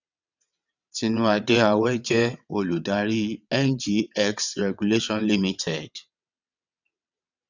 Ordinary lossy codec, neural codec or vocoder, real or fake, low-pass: none; vocoder, 22.05 kHz, 80 mel bands, WaveNeXt; fake; 7.2 kHz